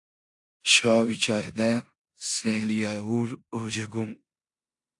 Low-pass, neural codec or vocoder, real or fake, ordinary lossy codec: 10.8 kHz; codec, 16 kHz in and 24 kHz out, 0.9 kbps, LongCat-Audio-Codec, four codebook decoder; fake; AAC, 64 kbps